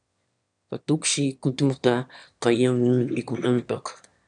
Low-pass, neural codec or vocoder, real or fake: 9.9 kHz; autoencoder, 22.05 kHz, a latent of 192 numbers a frame, VITS, trained on one speaker; fake